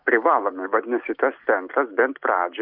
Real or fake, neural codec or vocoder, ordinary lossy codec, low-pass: real; none; Opus, 64 kbps; 5.4 kHz